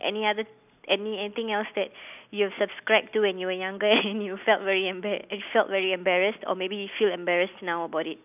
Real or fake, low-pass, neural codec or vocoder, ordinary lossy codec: real; 3.6 kHz; none; none